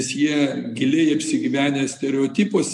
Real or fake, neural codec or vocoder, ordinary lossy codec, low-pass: real; none; MP3, 64 kbps; 10.8 kHz